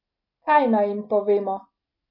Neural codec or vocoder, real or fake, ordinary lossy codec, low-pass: none; real; MP3, 32 kbps; 5.4 kHz